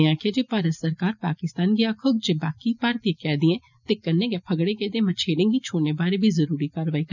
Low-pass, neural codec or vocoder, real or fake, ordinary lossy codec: 7.2 kHz; none; real; none